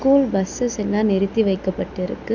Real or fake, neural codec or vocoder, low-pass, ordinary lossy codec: real; none; 7.2 kHz; none